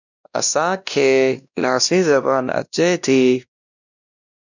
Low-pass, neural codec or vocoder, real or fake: 7.2 kHz; codec, 16 kHz, 1 kbps, X-Codec, WavLM features, trained on Multilingual LibriSpeech; fake